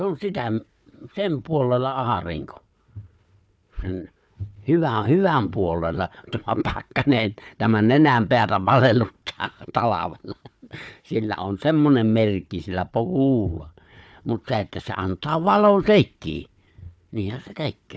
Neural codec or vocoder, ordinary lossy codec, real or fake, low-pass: codec, 16 kHz, 4 kbps, FunCodec, trained on Chinese and English, 50 frames a second; none; fake; none